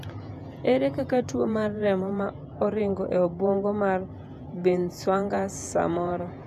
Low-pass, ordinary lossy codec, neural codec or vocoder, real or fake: 14.4 kHz; none; vocoder, 48 kHz, 128 mel bands, Vocos; fake